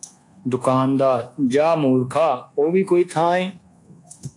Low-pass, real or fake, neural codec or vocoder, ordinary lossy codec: 10.8 kHz; fake; codec, 24 kHz, 1.2 kbps, DualCodec; AAC, 48 kbps